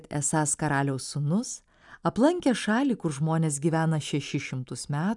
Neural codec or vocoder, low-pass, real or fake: none; 10.8 kHz; real